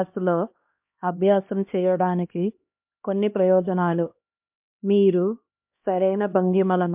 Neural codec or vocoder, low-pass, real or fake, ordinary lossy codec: codec, 16 kHz, 1 kbps, X-Codec, HuBERT features, trained on LibriSpeech; 3.6 kHz; fake; MP3, 32 kbps